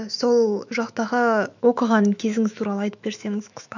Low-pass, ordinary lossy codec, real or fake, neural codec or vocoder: 7.2 kHz; none; fake; autoencoder, 48 kHz, 128 numbers a frame, DAC-VAE, trained on Japanese speech